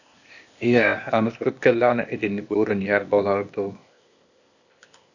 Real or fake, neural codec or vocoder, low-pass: fake; codec, 16 kHz, 0.8 kbps, ZipCodec; 7.2 kHz